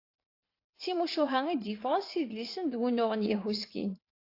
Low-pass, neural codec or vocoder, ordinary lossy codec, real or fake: 5.4 kHz; vocoder, 44.1 kHz, 80 mel bands, Vocos; AAC, 32 kbps; fake